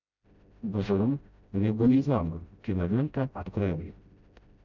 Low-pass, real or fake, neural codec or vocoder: 7.2 kHz; fake; codec, 16 kHz, 0.5 kbps, FreqCodec, smaller model